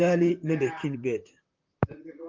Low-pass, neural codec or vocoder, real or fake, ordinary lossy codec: 7.2 kHz; vocoder, 44.1 kHz, 128 mel bands, Pupu-Vocoder; fake; Opus, 32 kbps